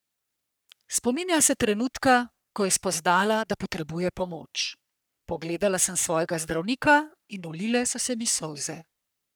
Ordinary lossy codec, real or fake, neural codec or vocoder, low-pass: none; fake; codec, 44.1 kHz, 3.4 kbps, Pupu-Codec; none